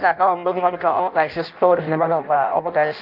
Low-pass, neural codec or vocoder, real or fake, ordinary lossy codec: 5.4 kHz; codec, 16 kHz in and 24 kHz out, 0.6 kbps, FireRedTTS-2 codec; fake; Opus, 24 kbps